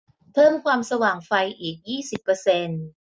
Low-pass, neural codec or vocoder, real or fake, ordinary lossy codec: none; none; real; none